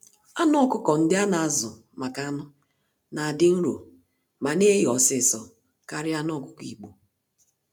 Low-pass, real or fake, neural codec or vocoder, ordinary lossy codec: none; real; none; none